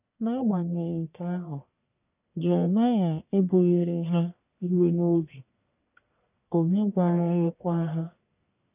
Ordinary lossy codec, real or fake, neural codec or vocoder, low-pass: none; fake; codec, 44.1 kHz, 1.7 kbps, Pupu-Codec; 3.6 kHz